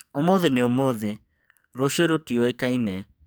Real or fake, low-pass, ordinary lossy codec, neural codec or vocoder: fake; none; none; codec, 44.1 kHz, 2.6 kbps, SNAC